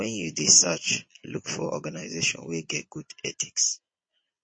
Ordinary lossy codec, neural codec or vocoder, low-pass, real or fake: MP3, 32 kbps; vocoder, 22.05 kHz, 80 mel bands, Vocos; 9.9 kHz; fake